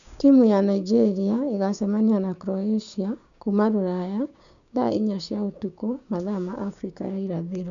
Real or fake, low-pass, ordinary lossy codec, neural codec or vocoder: fake; 7.2 kHz; none; codec, 16 kHz, 6 kbps, DAC